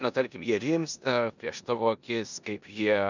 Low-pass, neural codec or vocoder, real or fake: 7.2 kHz; codec, 16 kHz in and 24 kHz out, 0.9 kbps, LongCat-Audio-Codec, four codebook decoder; fake